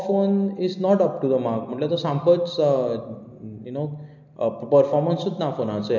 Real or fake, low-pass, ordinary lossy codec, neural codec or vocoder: real; 7.2 kHz; none; none